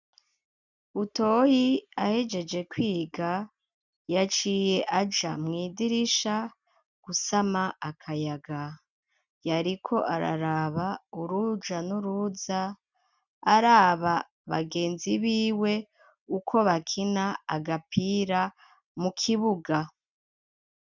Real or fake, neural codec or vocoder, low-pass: real; none; 7.2 kHz